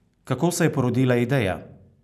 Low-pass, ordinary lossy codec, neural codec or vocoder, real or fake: 14.4 kHz; none; none; real